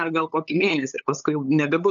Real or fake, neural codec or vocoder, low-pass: fake; codec, 16 kHz, 8 kbps, FunCodec, trained on LibriTTS, 25 frames a second; 7.2 kHz